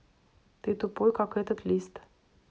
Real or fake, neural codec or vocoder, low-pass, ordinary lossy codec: real; none; none; none